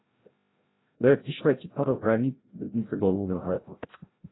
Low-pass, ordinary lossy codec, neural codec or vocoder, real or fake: 7.2 kHz; AAC, 16 kbps; codec, 16 kHz, 0.5 kbps, FreqCodec, larger model; fake